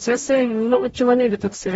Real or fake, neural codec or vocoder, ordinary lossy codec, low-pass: fake; codec, 44.1 kHz, 0.9 kbps, DAC; AAC, 24 kbps; 19.8 kHz